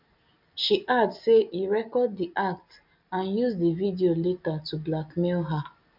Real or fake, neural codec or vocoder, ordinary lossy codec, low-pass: real; none; none; 5.4 kHz